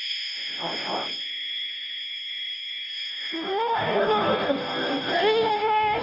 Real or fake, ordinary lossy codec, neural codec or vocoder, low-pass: fake; none; codec, 16 kHz, 0.5 kbps, FunCodec, trained on Chinese and English, 25 frames a second; 5.4 kHz